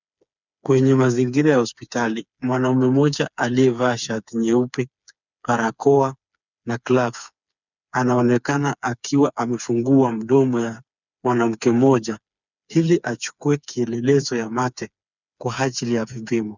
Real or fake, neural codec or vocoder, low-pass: fake; codec, 16 kHz, 4 kbps, FreqCodec, smaller model; 7.2 kHz